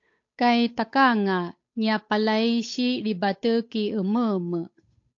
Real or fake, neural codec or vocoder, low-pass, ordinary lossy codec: fake; codec, 16 kHz, 8 kbps, FunCodec, trained on Chinese and English, 25 frames a second; 7.2 kHz; AAC, 48 kbps